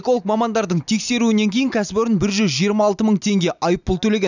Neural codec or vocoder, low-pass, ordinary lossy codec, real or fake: none; 7.2 kHz; none; real